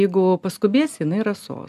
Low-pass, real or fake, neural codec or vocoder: 14.4 kHz; real; none